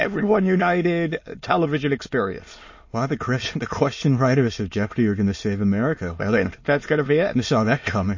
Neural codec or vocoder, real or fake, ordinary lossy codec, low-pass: autoencoder, 22.05 kHz, a latent of 192 numbers a frame, VITS, trained on many speakers; fake; MP3, 32 kbps; 7.2 kHz